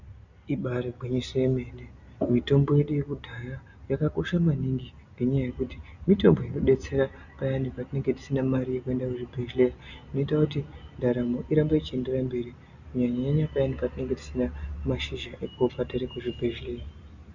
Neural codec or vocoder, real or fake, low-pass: none; real; 7.2 kHz